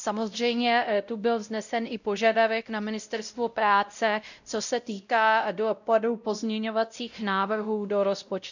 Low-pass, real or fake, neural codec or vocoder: 7.2 kHz; fake; codec, 16 kHz, 0.5 kbps, X-Codec, WavLM features, trained on Multilingual LibriSpeech